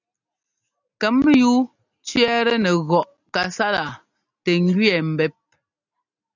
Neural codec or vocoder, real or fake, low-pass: none; real; 7.2 kHz